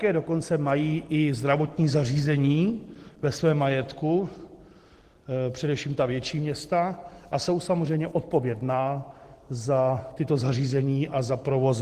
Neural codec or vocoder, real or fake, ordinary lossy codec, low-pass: none; real; Opus, 16 kbps; 14.4 kHz